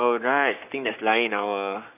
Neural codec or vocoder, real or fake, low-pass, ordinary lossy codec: codec, 44.1 kHz, 7.8 kbps, Pupu-Codec; fake; 3.6 kHz; none